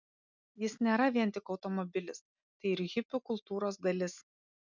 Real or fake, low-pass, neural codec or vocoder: real; 7.2 kHz; none